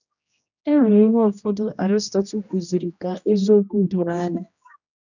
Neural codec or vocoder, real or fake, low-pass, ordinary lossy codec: codec, 16 kHz, 1 kbps, X-Codec, HuBERT features, trained on general audio; fake; 7.2 kHz; none